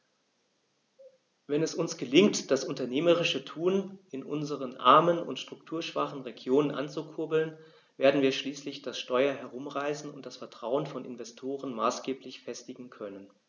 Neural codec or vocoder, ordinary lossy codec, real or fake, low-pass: none; none; real; 7.2 kHz